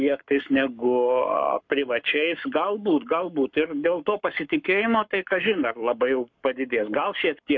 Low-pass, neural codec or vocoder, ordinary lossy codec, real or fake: 7.2 kHz; codec, 44.1 kHz, 7.8 kbps, Pupu-Codec; MP3, 48 kbps; fake